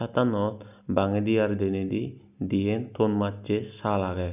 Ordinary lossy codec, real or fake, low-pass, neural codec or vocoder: none; real; 3.6 kHz; none